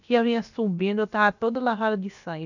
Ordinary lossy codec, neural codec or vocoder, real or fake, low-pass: none; codec, 16 kHz, about 1 kbps, DyCAST, with the encoder's durations; fake; 7.2 kHz